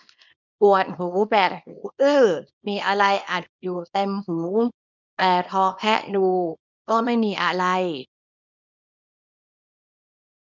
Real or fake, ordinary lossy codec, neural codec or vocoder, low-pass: fake; none; codec, 24 kHz, 0.9 kbps, WavTokenizer, small release; 7.2 kHz